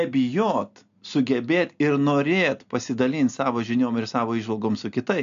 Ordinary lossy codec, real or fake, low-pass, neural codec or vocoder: AAC, 96 kbps; real; 7.2 kHz; none